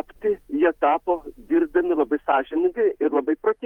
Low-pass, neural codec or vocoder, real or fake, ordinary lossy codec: 19.8 kHz; vocoder, 48 kHz, 128 mel bands, Vocos; fake; Opus, 32 kbps